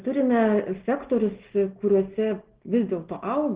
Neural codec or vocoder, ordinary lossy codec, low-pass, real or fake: none; Opus, 16 kbps; 3.6 kHz; real